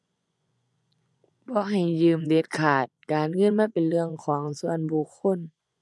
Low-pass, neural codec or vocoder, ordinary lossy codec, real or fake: none; vocoder, 24 kHz, 100 mel bands, Vocos; none; fake